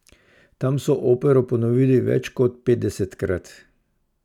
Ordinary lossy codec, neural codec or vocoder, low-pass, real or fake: none; none; 19.8 kHz; real